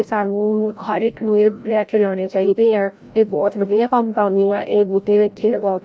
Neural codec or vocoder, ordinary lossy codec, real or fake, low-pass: codec, 16 kHz, 0.5 kbps, FreqCodec, larger model; none; fake; none